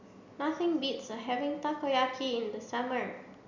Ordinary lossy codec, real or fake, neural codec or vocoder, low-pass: none; real; none; 7.2 kHz